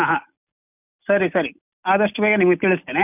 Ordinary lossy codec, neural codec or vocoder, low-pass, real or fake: none; none; 3.6 kHz; real